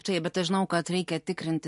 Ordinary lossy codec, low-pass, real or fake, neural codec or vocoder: MP3, 48 kbps; 14.4 kHz; real; none